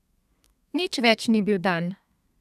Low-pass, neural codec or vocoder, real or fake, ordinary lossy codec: 14.4 kHz; codec, 32 kHz, 1.9 kbps, SNAC; fake; none